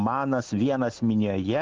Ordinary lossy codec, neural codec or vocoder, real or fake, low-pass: Opus, 32 kbps; none; real; 7.2 kHz